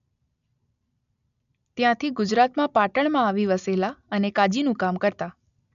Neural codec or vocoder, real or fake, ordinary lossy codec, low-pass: none; real; none; 7.2 kHz